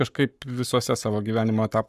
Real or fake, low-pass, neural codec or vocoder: fake; 14.4 kHz; codec, 44.1 kHz, 7.8 kbps, Pupu-Codec